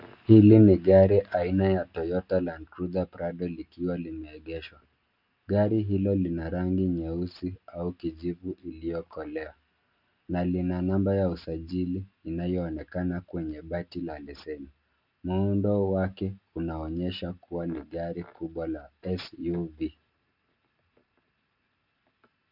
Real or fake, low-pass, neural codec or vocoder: real; 5.4 kHz; none